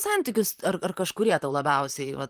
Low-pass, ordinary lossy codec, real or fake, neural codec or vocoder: 14.4 kHz; Opus, 32 kbps; fake; vocoder, 44.1 kHz, 128 mel bands, Pupu-Vocoder